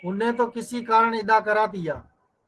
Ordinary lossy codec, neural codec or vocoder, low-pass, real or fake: Opus, 16 kbps; none; 10.8 kHz; real